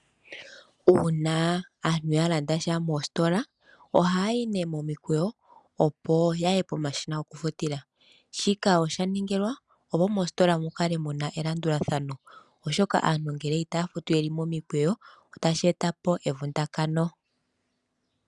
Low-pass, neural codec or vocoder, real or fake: 10.8 kHz; none; real